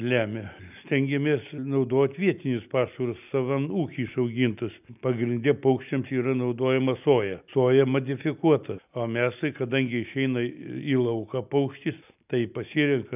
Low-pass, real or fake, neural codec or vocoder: 3.6 kHz; real; none